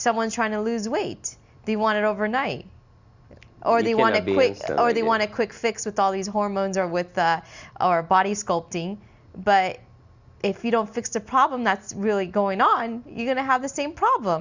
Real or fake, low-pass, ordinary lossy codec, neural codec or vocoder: real; 7.2 kHz; Opus, 64 kbps; none